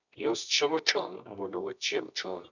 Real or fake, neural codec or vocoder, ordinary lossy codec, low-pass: fake; codec, 24 kHz, 0.9 kbps, WavTokenizer, medium music audio release; none; 7.2 kHz